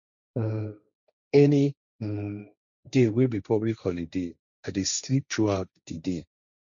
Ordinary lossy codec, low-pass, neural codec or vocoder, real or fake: none; 7.2 kHz; codec, 16 kHz, 1.1 kbps, Voila-Tokenizer; fake